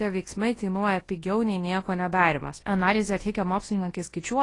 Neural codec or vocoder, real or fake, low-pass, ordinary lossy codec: codec, 24 kHz, 0.9 kbps, WavTokenizer, large speech release; fake; 10.8 kHz; AAC, 32 kbps